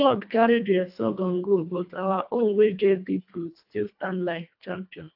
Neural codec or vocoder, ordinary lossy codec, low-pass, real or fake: codec, 24 kHz, 1.5 kbps, HILCodec; AAC, 48 kbps; 5.4 kHz; fake